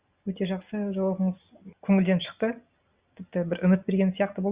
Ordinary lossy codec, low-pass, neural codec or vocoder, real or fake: Opus, 64 kbps; 3.6 kHz; none; real